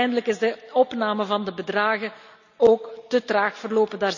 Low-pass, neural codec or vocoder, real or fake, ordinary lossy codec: 7.2 kHz; none; real; none